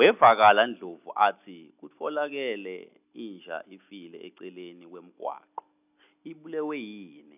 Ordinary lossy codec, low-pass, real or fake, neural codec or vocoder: none; 3.6 kHz; real; none